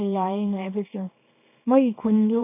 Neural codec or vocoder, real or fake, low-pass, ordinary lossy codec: codec, 24 kHz, 0.9 kbps, WavTokenizer, small release; fake; 3.6 kHz; none